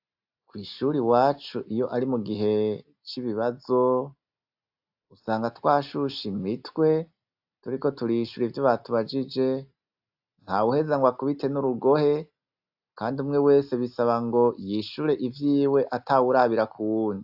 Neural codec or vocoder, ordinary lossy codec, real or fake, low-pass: none; AAC, 48 kbps; real; 5.4 kHz